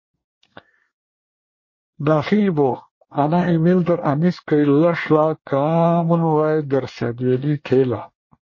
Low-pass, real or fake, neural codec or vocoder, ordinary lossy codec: 7.2 kHz; fake; codec, 24 kHz, 1 kbps, SNAC; MP3, 32 kbps